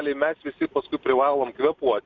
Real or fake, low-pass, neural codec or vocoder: real; 7.2 kHz; none